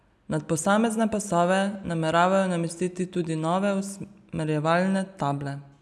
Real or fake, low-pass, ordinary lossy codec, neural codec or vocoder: real; none; none; none